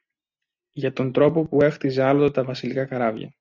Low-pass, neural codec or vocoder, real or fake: 7.2 kHz; none; real